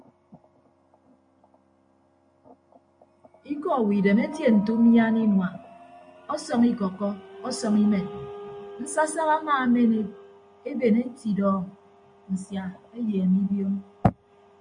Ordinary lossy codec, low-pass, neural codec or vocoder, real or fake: MP3, 48 kbps; 9.9 kHz; none; real